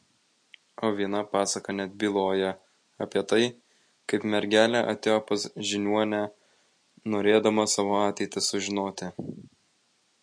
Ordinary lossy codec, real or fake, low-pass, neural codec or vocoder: MP3, 48 kbps; real; 9.9 kHz; none